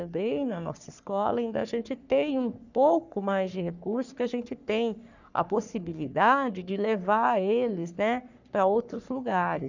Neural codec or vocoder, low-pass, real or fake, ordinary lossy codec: codec, 44.1 kHz, 3.4 kbps, Pupu-Codec; 7.2 kHz; fake; none